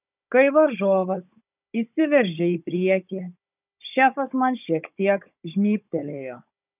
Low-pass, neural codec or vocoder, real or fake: 3.6 kHz; codec, 16 kHz, 16 kbps, FunCodec, trained on Chinese and English, 50 frames a second; fake